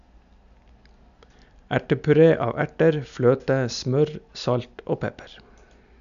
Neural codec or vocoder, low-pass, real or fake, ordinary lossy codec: none; 7.2 kHz; real; none